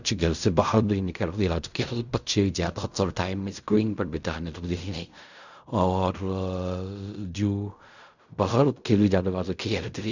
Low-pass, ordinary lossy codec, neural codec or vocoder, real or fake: 7.2 kHz; none; codec, 16 kHz in and 24 kHz out, 0.4 kbps, LongCat-Audio-Codec, fine tuned four codebook decoder; fake